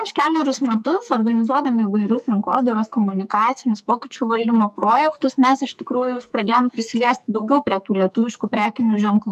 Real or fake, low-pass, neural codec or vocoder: fake; 14.4 kHz; codec, 44.1 kHz, 2.6 kbps, SNAC